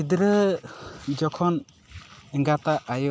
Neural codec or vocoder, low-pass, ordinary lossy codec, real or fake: none; none; none; real